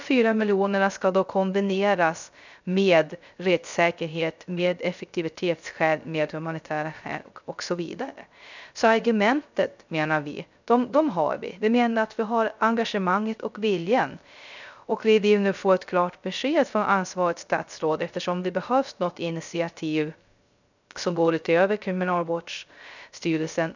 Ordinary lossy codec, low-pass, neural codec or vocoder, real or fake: none; 7.2 kHz; codec, 16 kHz, 0.3 kbps, FocalCodec; fake